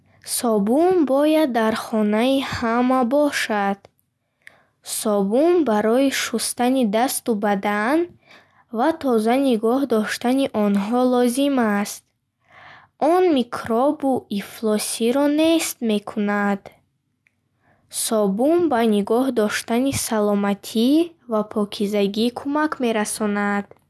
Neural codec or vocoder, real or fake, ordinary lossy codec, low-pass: none; real; none; none